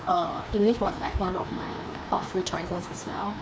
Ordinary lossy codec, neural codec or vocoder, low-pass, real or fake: none; codec, 16 kHz, 2 kbps, FreqCodec, larger model; none; fake